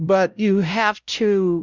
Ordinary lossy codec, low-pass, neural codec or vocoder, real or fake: Opus, 64 kbps; 7.2 kHz; codec, 16 kHz, 0.5 kbps, X-Codec, WavLM features, trained on Multilingual LibriSpeech; fake